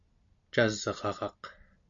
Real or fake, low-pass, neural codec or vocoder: real; 7.2 kHz; none